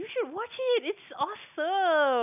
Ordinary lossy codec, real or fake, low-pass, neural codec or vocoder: none; real; 3.6 kHz; none